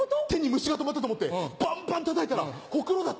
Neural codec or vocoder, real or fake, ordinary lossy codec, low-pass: none; real; none; none